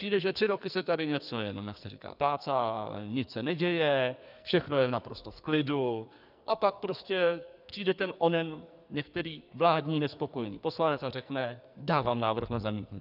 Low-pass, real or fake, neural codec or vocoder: 5.4 kHz; fake; codec, 44.1 kHz, 2.6 kbps, SNAC